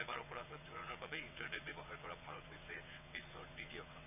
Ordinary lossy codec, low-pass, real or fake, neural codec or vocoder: none; 3.6 kHz; fake; vocoder, 22.05 kHz, 80 mel bands, WaveNeXt